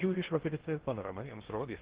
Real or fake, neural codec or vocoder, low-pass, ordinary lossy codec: fake; codec, 16 kHz in and 24 kHz out, 0.6 kbps, FocalCodec, streaming, 2048 codes; 3.6 kHz; Opus, 16 kbps